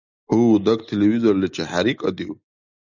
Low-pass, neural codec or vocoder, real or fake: 7.2 kHz; none; real